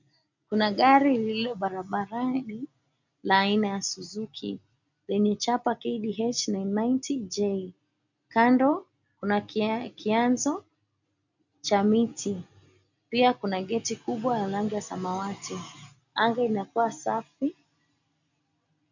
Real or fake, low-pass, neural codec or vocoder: real; 7.2 kHz; none